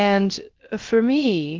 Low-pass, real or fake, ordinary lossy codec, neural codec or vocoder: 7.2 kHz; fake; Opus, 16 kbps; codec, 16 kHz, about 1 kbps, DyCAST, with the encoder's durations